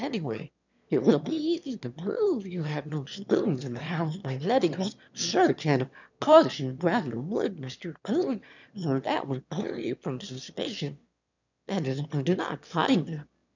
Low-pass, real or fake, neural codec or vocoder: 7.2 kHz; fake; autoencoder, 22.05 kHz, a latent of 192 numbers a frame, VITS, trained on one speaker